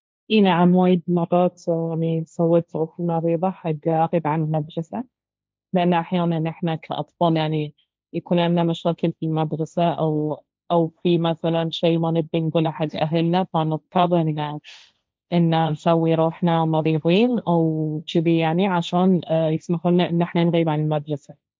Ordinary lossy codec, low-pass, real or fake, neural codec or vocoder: none; none; fake; codec, 16 kHz, 1.1 kbps, Voila-Tokenizer